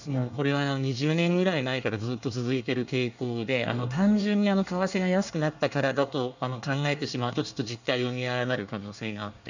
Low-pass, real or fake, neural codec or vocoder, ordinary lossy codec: 7.2 kHz; fake; codec, 24 kHz, 1 kbps, SNAC; none